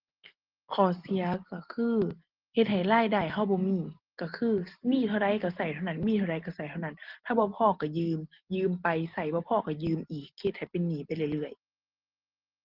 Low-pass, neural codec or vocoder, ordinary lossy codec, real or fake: 5.4 kHz; none; Opus, 16 kbps; real